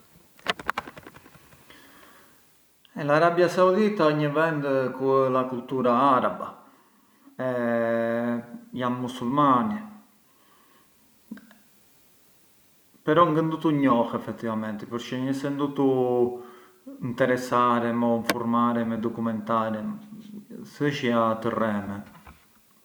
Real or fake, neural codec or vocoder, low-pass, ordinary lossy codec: real; none; none; none